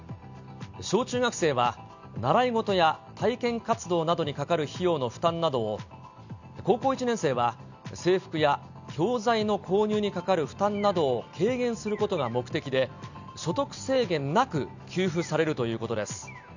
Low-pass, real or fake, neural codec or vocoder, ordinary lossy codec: 7.2 kHz; real; none; none